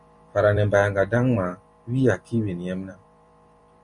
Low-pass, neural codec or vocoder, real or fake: 10.8 kHz; vocoder, 44.1 kHz, 128 mel bands every 256 samples, BigVGAN v2; fake